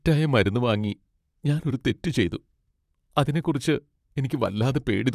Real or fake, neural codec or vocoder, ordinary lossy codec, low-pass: fake; vocoder, 44.1 kHz, 128 mel bands, Pupu-Vocoder; none; 14.4 kHz